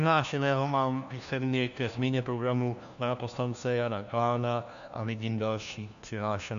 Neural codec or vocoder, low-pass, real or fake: codec, 16 kHz, 1 kbps, FunCodec, trained on LibriTTS, 50 frames a second; 7.2 kHz; fake